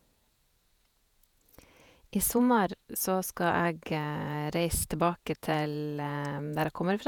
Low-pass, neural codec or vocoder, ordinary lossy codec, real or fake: none; vocoder, 48 kHz, 128 mel bands, Vocos; none; fake